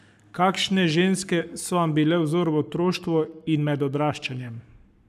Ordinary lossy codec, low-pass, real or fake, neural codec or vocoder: none; 14.4 kHz; fake; codec, 44.1 kHz, 7.8 kbps, Pupu-Codec